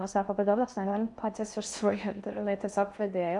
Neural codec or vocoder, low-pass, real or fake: codec, 16 kHz in and 24 kHz out, 0.6 kbps, FocalCodec, streaming, 4096 codes; 10.8 kHz; fake